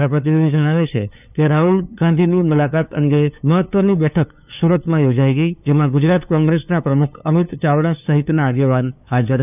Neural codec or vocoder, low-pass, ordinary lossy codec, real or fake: codec, 16 kHz, 2 kbps, FunCodec, trained on LibriTTS, 25 frames a second; 3.6 kHz; none; fake